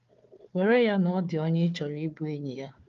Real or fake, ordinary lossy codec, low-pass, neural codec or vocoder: fake; Opus, 32 kbps; 7.2 kHz; codec, 16 kHz, 4 kbps, FunCodec, trained on Chinese and English, 50 frames a second